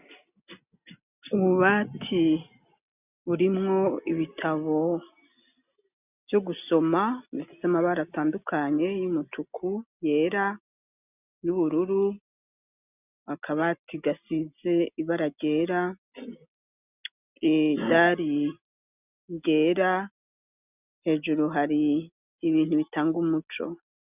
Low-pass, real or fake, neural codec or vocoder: 3.6 kHz; real; none